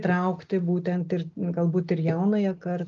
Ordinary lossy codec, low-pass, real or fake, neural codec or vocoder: Opus, 24 kbps; 7.2 kHz; real; none